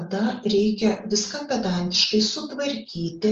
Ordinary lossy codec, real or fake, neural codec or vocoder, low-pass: Opus, 24 kbps; real; none; 7.2 kHz